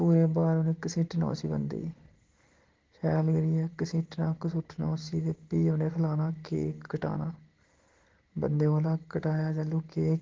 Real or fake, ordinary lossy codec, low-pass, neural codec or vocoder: real; Opus, 16 kbps; 7.2 kHz; none